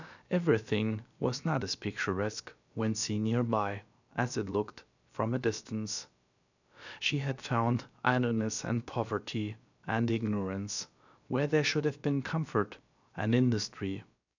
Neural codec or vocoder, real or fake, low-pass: codec, 16 kHz, about 1 kbps, DyCAST, with the encoder's durations; fake; 7.2 kHz